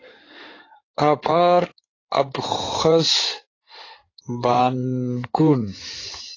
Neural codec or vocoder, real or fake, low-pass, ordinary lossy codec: codec, 16 kHz in and 24 kHz out, 2.2 kbps, FireRedTTS-2 codec; fake; 7.2 kHz; AAC, 32 kbps